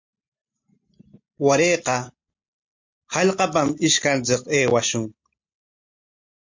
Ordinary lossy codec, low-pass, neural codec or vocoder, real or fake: MP3, 48 kbps; 7.2 kHz; none; real